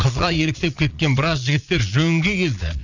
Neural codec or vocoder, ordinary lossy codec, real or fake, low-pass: vocoder, 22.05 kHz, 80 mel bands, Vocos; none; fake; 7.2 kHz